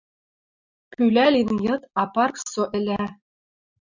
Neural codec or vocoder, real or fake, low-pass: none; real; 7.2 kHz